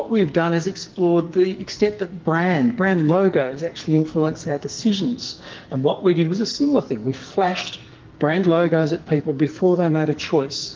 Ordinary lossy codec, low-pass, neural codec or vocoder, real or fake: Opus, 32 kbps; 7.2 kHz; codec, 44.1 kHz, 2.6 kbps, SNAC; fake